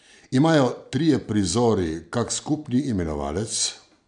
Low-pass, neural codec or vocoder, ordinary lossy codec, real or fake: 9.9 kHz; none; none; real